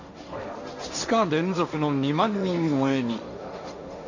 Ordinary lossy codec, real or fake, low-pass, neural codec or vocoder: none; fake; 7.2 kHz; codec, 16 kHz, 1.1 kbps, Voila-Tokenizer